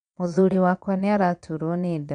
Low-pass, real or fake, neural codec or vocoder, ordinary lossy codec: 9.9 kHz; fake; vocoder, 22.05 kHz, 80 mel bands, Vocos; none